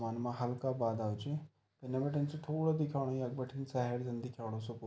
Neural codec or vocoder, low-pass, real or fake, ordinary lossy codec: none; none; real; none